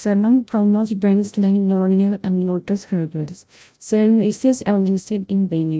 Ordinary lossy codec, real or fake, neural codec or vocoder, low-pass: none; fake; codec, 16 kHz, 0.5 kbps, FreqCodec, larger model; none